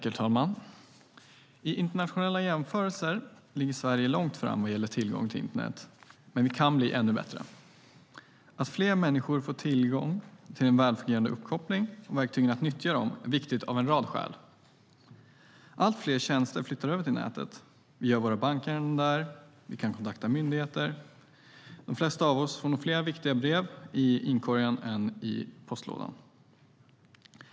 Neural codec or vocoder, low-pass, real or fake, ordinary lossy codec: none; none; real; none